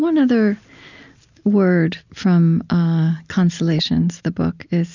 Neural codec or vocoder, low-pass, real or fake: none; 7.2 kHz; real